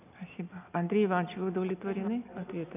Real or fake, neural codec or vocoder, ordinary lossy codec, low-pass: real; none; none; 3.6 kHz